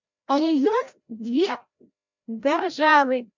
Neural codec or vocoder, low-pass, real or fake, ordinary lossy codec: codec, 16 kHz, 0.5 kbps, FreqCodec, larger model; 7.2 kHz; fake; MP3, 64 kbps